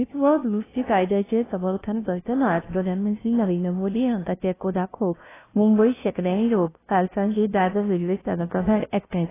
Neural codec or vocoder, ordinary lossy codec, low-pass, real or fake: codec, 16 kHz, 0.5 kbps, FunCodec, trained on LibriTTS, 25 frames a second; AAC, 16 kbps; 3.6 kHz; fake